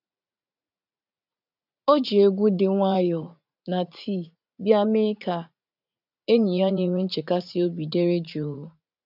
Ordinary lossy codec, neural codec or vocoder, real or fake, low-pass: none; vocoder, 44.1 kHz, 80 mel bands, Vocos; fake; 5.4 kHz